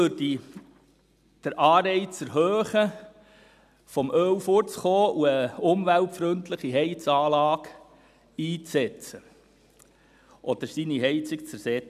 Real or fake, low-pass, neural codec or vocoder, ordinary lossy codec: real; 14.4 kHz; none; none